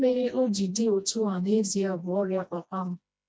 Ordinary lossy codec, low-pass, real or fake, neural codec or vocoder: none; none; fake; codec, 16 kHz, 1 kbps, FreqCodec, smaller model